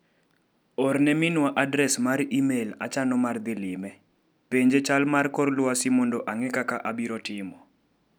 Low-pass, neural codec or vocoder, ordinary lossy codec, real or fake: none; none; none; real